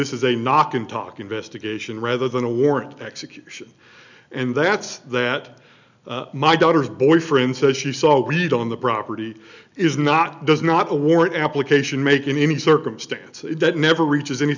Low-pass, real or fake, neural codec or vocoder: 7.2 kHz; real; none